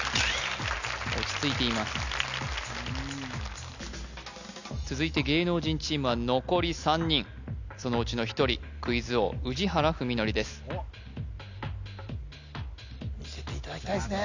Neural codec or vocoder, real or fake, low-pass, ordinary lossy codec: none; real; 7.2 kHz; none